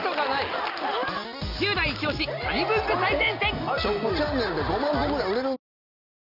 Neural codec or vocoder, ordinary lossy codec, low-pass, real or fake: none; none; 5.4 kHz; real